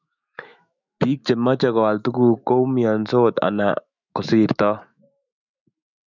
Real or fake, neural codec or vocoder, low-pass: fake; autoencoder, 48 kHz, 128 numbers a frame, DAC-VAE, trained on Japanese speech; 7.2 kHz